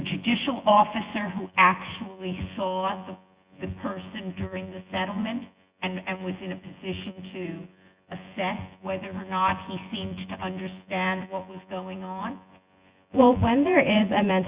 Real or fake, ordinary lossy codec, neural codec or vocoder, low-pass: fake; Opus, 64 kbps; vocoder, 24 kHz, 100 mel bands, Vocos; 3.6 kHz